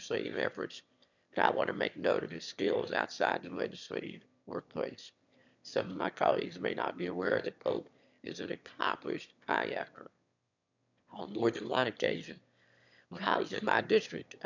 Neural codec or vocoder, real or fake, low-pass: autoencoder, 22.05 kHz, a latent of 192 numbers a frame, VITS, trained on one speaker; fake; 7.2 kHz